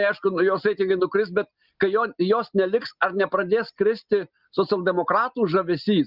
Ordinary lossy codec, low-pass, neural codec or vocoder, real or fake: Opus, 64 kbps; 5.4 kHz; none; real